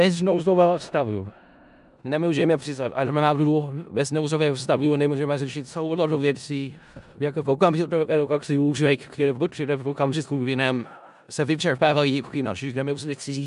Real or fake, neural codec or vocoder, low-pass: fake; codec, 16 kHz in and 24 kHz out, 0.4 kbps, LongCat-Audio-Codec, four codebook decoder; 10.8 kHz